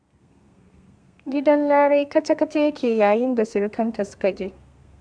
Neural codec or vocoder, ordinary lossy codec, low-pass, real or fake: codec, 32 kHz, 1.9 kbps, SNAC; AAC, 64 kbps; 9.9 kHz; fake